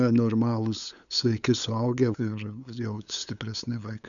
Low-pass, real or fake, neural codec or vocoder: 7.2 kHz; fake; codec, 16 kHz, 8 kbps, FunCodec, trained on LibriTTS, 25 frames a second